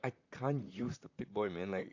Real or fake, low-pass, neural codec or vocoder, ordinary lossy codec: fake; 7.2 kHz; vocoder, 44.1 kHz, 128 mel bands, Pupu-Vocoder; AAC, 48 kbps